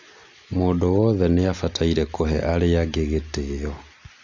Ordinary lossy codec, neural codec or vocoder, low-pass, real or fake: none; none; 7.2 kHz; real